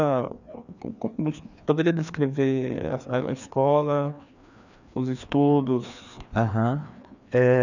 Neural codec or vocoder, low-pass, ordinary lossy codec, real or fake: codec, 16 kHz, 2 kbps, FreqCodec, larger model; 7.2 kHz; none; fake